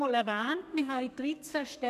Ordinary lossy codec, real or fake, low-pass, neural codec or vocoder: none; fake; 14.4 kHz; codec, 32 kHz, 1.9 kbps, SNAC